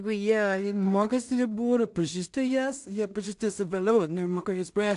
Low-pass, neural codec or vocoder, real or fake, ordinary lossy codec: 10.8 kHz; codec, 16 kHz in and 24 kHz out, 0.4 kbps, LongCat-Audio-Codec, two codebook decoder; fake; AAC, 64 kbps